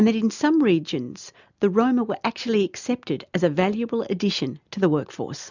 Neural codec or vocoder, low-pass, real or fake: none; 7.2 kHz; real